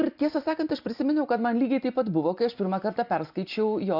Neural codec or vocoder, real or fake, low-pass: none; real; 5.4 kHz